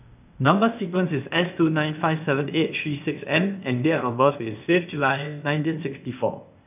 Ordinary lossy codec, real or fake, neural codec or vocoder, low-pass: none; fake; codec, 16 kHz, 0.8 kbps, ZipCodec; 3.6 kHz